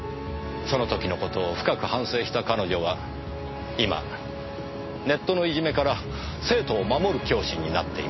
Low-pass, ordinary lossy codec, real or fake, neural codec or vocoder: 7.2 kHz; MP3, 24 kbps; real; none